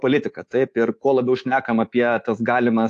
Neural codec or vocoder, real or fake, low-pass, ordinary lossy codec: codec, 24 kHz, 3.1 kbps, DualCodec; fake; 10.8 kHz; AAC, 48 kbps